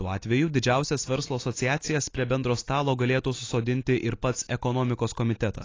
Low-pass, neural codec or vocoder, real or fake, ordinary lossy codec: 7.2 kHz; none; real; AAC, 32 kbps